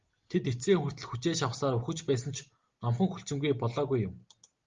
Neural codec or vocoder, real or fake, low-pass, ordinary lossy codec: none; real; 7.2 kHz; Opus, 32 kbps